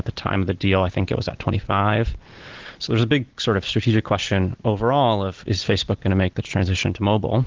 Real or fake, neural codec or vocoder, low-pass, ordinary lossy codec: real; none; 7.2 kHz; Opus, 16 kbps